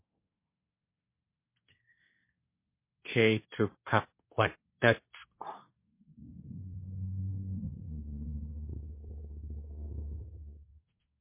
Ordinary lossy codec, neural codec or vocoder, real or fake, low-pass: MP3, 24 kbps; codec, 24 kHz, 1 kbps, SNAC; fake; 3.6 kHz